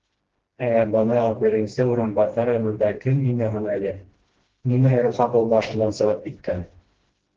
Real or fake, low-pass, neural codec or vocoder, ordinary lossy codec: fake; 7.2 kHz; codec, 16 kHz, 1 kbps, FreqCodec, smaller model; Opus, 16 kbps